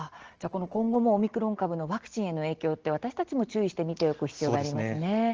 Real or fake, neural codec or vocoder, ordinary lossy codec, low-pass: real; none; Opus, 16 kbps; 7.2 kHz